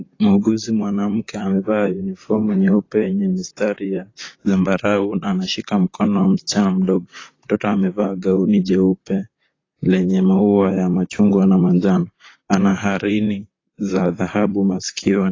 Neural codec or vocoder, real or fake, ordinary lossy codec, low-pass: vocoder, 44.1 kHz, 128 mel bands, Pupu-Vocoder; fake; AAC, 32 kbps; 7.2 kHz